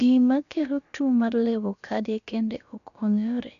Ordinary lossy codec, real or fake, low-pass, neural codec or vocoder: none; fake; 7.2 kHz; codec, 16 kHz, about 1 kbps, DyCAST, with the encoder's durations